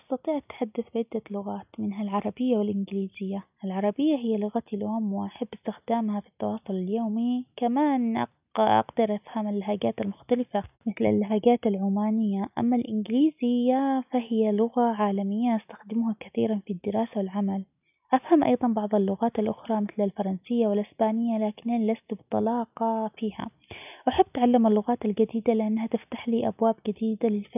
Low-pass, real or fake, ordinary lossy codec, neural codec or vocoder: 3.6 kHz; real; none; none